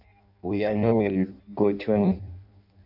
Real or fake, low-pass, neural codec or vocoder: fake; 5.4 kHz; codec, 16 kHz in and 24 kHz out, 0.6 kbps, FireRedTTS-2 codec